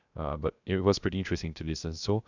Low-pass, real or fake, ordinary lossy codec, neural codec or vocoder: 7.2 kHz; fake; none; codec, 16 kHz, 0.3 kbps, FocalCodec